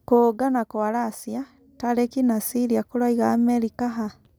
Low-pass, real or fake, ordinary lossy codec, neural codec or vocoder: none; real; none; none